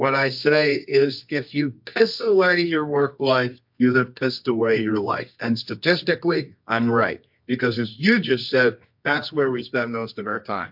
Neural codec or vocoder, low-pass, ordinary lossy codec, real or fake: codec, 24 kHz, 0.9 kbps, WavTokenizer, medium music audio release; 5.4 kHz; MP3, 48 kbps; fake